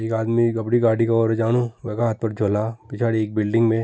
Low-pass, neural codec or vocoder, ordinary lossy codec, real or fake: none; none; none; real